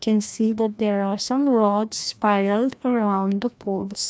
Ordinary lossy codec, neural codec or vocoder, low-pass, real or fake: none; codec, 16 kHz, 1 kbps, FreqCodec, larger model; none; fake